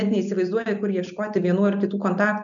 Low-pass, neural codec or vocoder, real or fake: 7.2 kHz; none; real